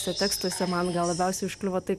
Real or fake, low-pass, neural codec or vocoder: real; 14.4 kHz; none